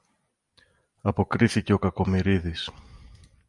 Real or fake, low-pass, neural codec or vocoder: real; 10.8 kHz; none